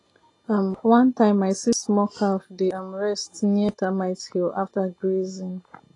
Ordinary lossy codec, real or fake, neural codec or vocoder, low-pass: AAC, 32 kbps; real; none; 10.8 kHz